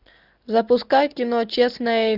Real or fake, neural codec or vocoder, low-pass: real; none; 5.4 kHz